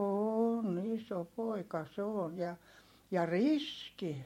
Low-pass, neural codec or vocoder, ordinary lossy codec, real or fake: 19.8 kHz; vocoder, 44.1 kHz, 128 mel bands every 512 samples, BigVGAN v2; MP3, 64 kbps; fake